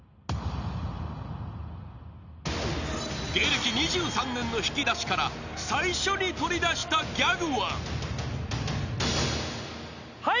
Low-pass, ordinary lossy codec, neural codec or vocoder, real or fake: 7.2 kHz; none; none; real